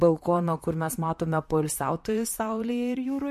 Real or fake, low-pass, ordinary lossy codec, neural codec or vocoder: fake; 14.4 kHz; MP3, 64 kbps; vocoder, 44.1 kHz, 128 mel bands, Pupu-Vocoder